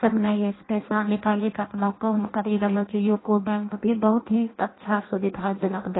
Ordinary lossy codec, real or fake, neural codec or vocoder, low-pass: AAC, 16 kbps; fake; codec, 16 kHz in and 24 kHz out, 0.6 kbps, FireRedTTS-2 codec; 7.2 kHz